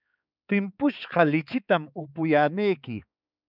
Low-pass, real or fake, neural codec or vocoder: 5.4 kHz; fake; codec, 16 kHz, 4 kbps, X-Codec, HuBERT features, trained on balanced general audio